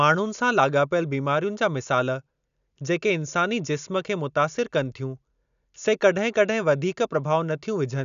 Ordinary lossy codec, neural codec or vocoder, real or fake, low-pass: none; none; real; 7.2 kHz